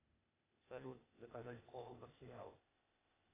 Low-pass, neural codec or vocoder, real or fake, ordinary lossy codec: 3.6 kHz; codec, 16 kHz, 0.8 kbps, ZipCodec; fake; AAC, 16 kbps